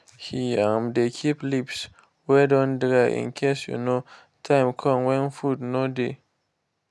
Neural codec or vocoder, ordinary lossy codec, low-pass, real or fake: none; none; none; real